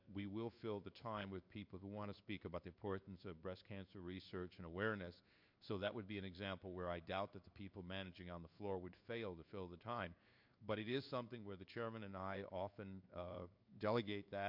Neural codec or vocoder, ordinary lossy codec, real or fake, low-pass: codec, 16 kHz in and 24 kHz out, 1 kbps, XY-Tokenizer; MP3, 48 kbps; fake; 5.4 kHz